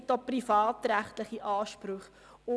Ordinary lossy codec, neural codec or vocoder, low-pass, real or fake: none; none; none; real